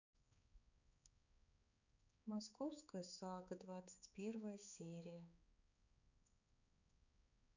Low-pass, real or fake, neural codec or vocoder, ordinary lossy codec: 7.2 kHz; fake; codec, 16 kHz, 4 kbps, X-Codec, HuBERT features, trained on balanced general audio; none